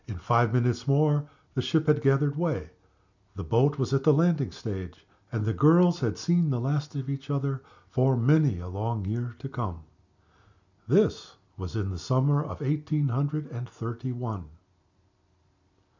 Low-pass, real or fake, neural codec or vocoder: 7.2 kHz; real; none